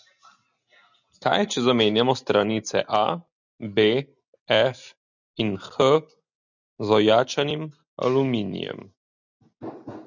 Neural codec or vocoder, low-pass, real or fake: none; 7.2 kHz; real